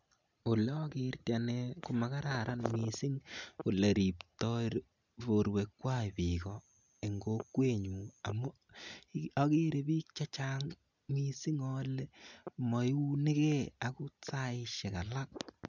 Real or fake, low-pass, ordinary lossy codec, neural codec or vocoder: real; 7.2 kHz; none; none